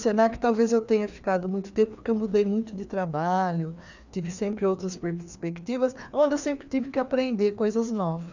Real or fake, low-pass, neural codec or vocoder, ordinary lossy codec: fake; 7.2 kHz; codec, 16 kHz, 2 kbps, FreqCodec, larger model; none